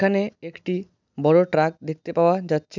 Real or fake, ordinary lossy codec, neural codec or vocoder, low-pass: real; none; none; 7.2 kHz